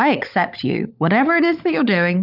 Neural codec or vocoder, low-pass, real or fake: codec, 16 kHz, 16 kbps, FunCodec, trained on LibriTTS, 50 frames a second; 5.4 kHz; fake